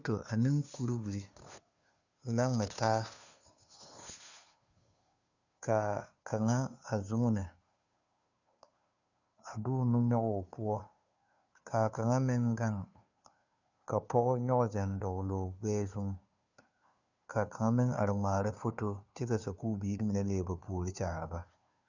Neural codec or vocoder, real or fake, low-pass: codec, 16 kHz, 2 kbps, FunCodec, trained on Chinese and English, 25 frames a second; fake; 7.2 kHz